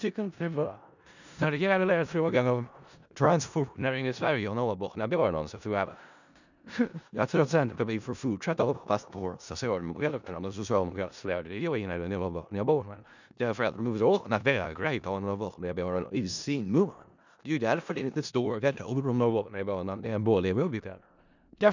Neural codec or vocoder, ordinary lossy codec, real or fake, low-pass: codec, 16 kHz in and 24 kHz out, 0.4 kbps, LongCat-Audio-Codec, four codebook decoder; none; fake; 7.2 kHz